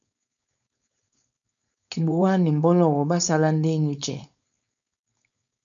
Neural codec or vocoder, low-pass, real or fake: codec, 16 kHz, 4.8 kbps, FACodec; 7.2 kHz; fake